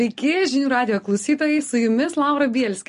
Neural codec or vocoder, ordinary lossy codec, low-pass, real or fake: none; MP3, 48 kbps; 10.8 kHz; real